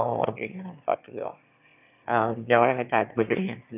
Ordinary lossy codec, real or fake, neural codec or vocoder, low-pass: none; fake; autoencoder, 22.05 kHz, a latent of 192 numbers a frame, VITS, trained on one speaker; 3.6 kHz